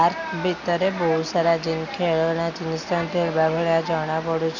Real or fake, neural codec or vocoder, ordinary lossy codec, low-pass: real; none; none; 7.2 kHz